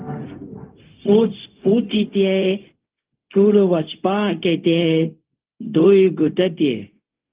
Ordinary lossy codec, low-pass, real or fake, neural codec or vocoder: Opus, 32 kbps; 3.6 kHz; fake; codec, 16 kHz, 0.4 kbps, LongCat-Audio-Codec